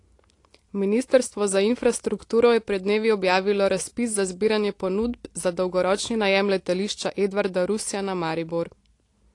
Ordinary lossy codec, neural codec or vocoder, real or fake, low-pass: AAC, 48 kbps; none; real; 10.8 kHz